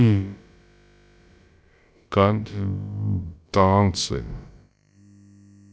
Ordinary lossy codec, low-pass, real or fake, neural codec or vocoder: none; none; fake; codec, 16 kHz, about 1 kbps, DyCAST, with the encoder's durations